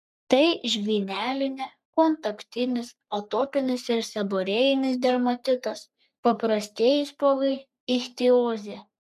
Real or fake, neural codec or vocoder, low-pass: fake; codec, 44.1 kHz, 3.4 kbps, Pupu-Codec; 14.4 kHz